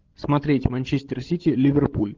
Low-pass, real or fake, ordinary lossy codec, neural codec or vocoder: 7.2 kHz; fake; Opus, 16 kbps; codec, 16 kHz, 16 kbps, FreqCodec, larger model